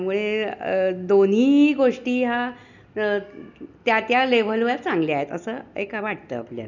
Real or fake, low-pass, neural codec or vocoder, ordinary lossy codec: real; 7.2 kHz; none; none